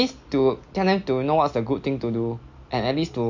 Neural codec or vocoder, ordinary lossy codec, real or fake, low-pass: none; MP3, 48 kbps; real; 7.2 kHz